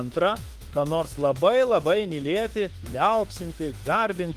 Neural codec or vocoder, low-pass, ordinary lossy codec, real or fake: autoencoder, 48 kHz, 32 numbers a frame, DAC-VAE, trained on Japanese speech; 14.4 kHz; Opus, 24 kbps; fake